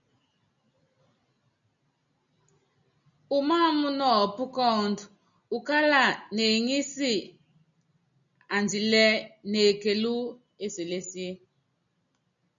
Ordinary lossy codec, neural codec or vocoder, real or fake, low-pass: MP3, 96 kbps; none; real; 7.2 kHz